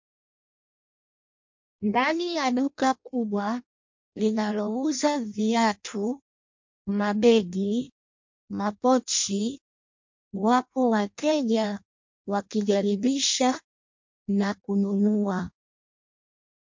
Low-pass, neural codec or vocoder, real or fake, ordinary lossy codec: 7.2 kHz; codec, 16 kHz in and 24 kHz out, 0.6 kbps, FireRedTTS-2 codec; fake; MP3, 48 kbps